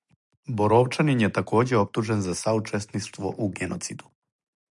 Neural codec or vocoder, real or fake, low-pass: none; real; 10.8 kHz